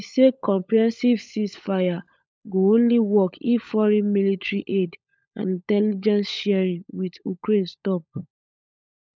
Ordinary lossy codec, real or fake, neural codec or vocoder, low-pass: none; fake; codec, 16 kHz, 16 kbps, FunCodec, trained on LibriTTS, 50 frames a second; none